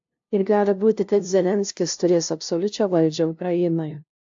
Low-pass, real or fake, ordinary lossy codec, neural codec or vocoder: 7.2 kHz; fake; AAC, 64 kbps; codec, 16 kHz, 0.5 kbps, FunCodec, trained on LibriTTS, 25 frames a second